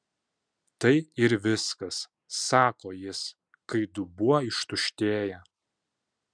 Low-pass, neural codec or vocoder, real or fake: 9.9 kHz; none; real